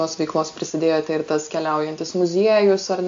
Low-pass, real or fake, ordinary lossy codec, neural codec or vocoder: 7.2 kHz; real; AAC, 64 kbps; none